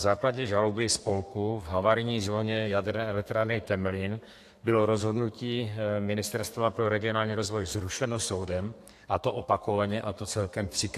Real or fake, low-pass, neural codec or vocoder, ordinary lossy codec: fake; 14.4 kHz; codec, 32 kHz, 1.9 kbps, SNAC; AAC, 64 kbps